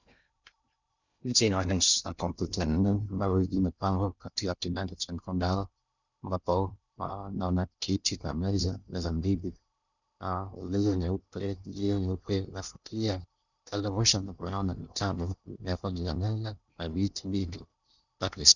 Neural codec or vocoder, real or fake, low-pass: codec, 16 kHz in and 24 kHz out, 0.6 kbps, FocalCodec, streaming, 2048 codes; fake; 7.2 kHz